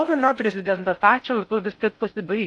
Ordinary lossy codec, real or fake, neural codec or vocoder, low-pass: AAC, 64 kbps; fake; codec, 16 kHz in and 24 kHz out, 0.6 kbps, FocalCodec, streaming, 4096 codes; 10.8 kHz